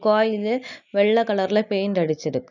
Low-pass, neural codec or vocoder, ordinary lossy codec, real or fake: 7.2 kHz; none; none; real